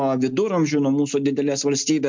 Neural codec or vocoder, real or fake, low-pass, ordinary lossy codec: none; real; 7.2 kHz; MP3, 64 kbps